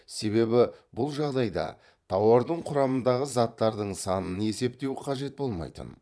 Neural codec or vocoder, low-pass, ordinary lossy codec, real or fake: vocoder, 22.05 kHz, 80 mel bands, Vocos; none; none; fake